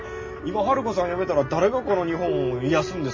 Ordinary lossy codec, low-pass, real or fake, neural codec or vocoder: MP3, 32 kbps; 7.2 kHz; real; none